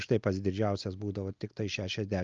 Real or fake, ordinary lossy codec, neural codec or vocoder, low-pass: real; Opus, 24 kbps; none; 7.2 kHz